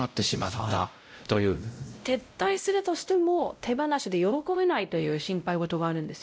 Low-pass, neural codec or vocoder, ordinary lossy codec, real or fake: none; codec, 16 kHz, 0.5 kbps, X-Codec, WavLM features, trained on Multilingual LibriSpeech; none; fake